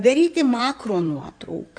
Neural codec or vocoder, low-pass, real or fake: codec, 16 kHz in and 24 kHz out, 2.2 kbps, FireRedTTS-2 codec; 9.9 kHz; fake